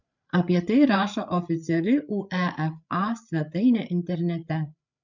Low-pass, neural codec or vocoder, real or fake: 7.2 kHz; codec, 16 kHz, 8 kbps, FreqCodec, larger model; fake